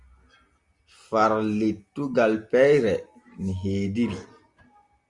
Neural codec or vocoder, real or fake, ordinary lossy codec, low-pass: none; real; Opus, 64 kbps; 10.8 kHz